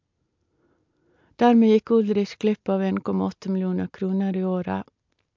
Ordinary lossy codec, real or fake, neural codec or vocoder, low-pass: MP3, 64 kbps; real; none; 7.2 kHz